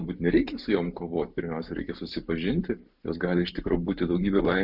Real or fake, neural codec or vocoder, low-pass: real; none; 5.4 kHz